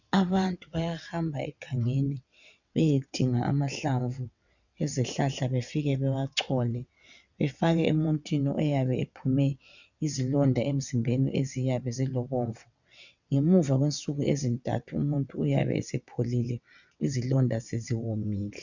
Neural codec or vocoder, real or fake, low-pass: vocoder, 22.05 kHz, 80 mel bands, WaveNeXt; fake; 7.2 kHz